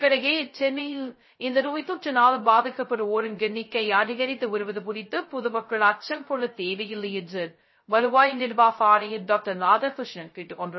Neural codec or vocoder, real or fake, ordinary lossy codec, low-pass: codec, 16 kHz, 0.2 kbps, FocalCodec; fake; MP3, 24 kbps; 7.2 kHz